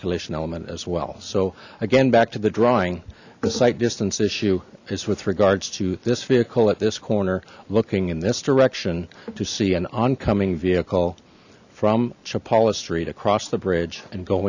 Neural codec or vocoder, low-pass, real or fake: none; 7.2 kHz; real